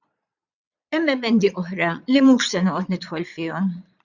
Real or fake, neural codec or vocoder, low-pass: fake; vocoder, 22.05 kHz, 80 mel bands, Vocos; 7.2 kHz